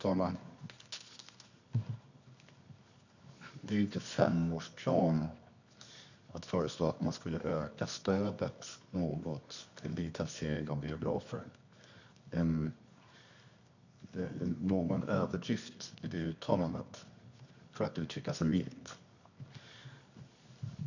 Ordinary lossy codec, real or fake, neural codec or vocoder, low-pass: none; fake; codec, 24 kHz, 0.9 kbps, WavTokenizer, medium music audio release; 7.2 kHz